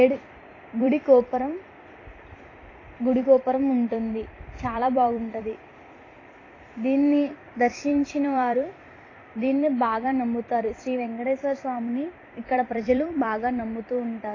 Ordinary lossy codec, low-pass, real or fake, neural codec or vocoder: AAC, 32 kbps; 7.2 kHz; fake; vocoder, 44.1 kHz, 128 mel bands every 256 samples, BigVGAN v2